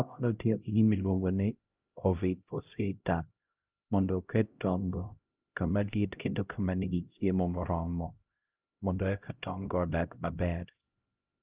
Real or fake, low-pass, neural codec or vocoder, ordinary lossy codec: fake; 3.6 kHz; codec, 16 kHz, 0.5 kbps, X-Codec, HuBERT features, trained on LibriSpeech; Opus, 24 kbps